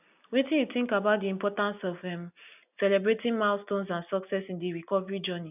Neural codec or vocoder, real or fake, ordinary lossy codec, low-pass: none; real; none; 3.6 kHz